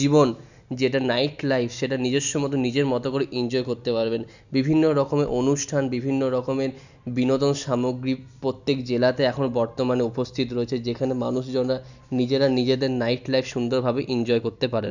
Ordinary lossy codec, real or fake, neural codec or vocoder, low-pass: none; real; none; 7.2 kHz